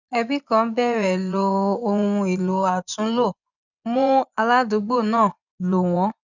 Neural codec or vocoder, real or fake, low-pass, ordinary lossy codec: vocoder, 24 kHz, 100 mel bands, Vocos; fake; 7.2 kHz; none